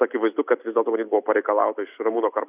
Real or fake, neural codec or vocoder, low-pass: real; none; 3.6 kHz